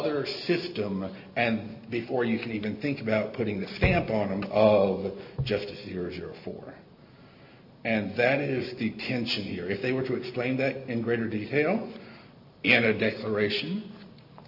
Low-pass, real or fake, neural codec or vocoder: 5.4 kHz; real; none